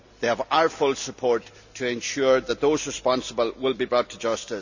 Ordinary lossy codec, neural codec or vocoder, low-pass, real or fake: none; none; 7.2 kHz; real